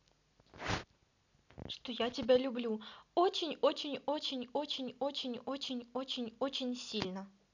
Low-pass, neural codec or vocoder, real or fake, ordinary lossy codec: 7.2 kHz; none; real; none